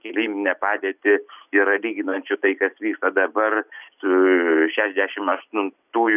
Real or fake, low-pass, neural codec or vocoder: real; 3.6 kHz; none